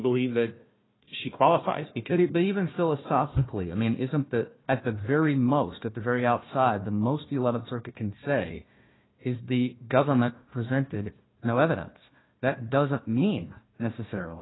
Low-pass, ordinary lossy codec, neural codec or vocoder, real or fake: 7.2 kHz; AAC, 16 kbps; codec, 16 kHz, 1 kbps, FunCodec, trained on Chinese and English, 50 frames a second; fake